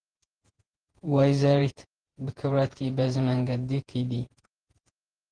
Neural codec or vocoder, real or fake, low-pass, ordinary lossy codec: vocoder, 48 kHz, 128 mel bands, Vocos; fake; 9.9 kHz; Opus, 16 kbps